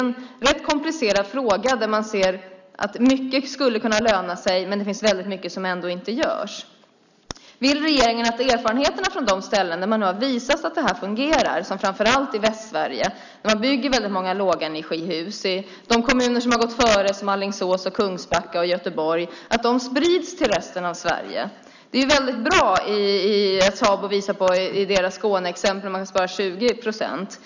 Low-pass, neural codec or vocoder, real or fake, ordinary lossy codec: 7.2 kHz; none; real; none